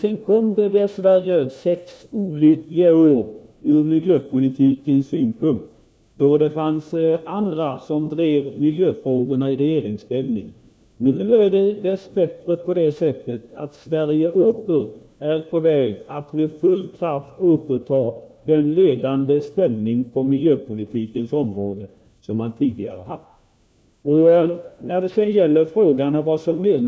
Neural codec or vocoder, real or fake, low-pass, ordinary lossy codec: codec, 16 kHz, 1 kbps, FunCodec, trained on LibriTTS, 50 frames a second; fake; none; none